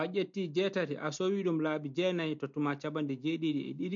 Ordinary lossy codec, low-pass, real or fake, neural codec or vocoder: MP3, 48 kbps; 7.2 kHz; real; none